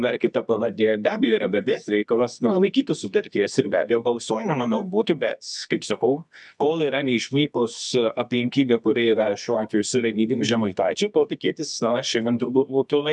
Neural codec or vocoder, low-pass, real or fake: codec, 24 kHz, 0.9 kbps, WavTokenizer, medium music audio release; 10.8 kHz; fake